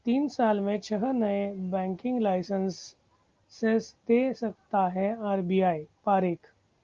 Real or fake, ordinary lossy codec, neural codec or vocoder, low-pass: real; Opus, 32 kbps; none; 7.2 kHz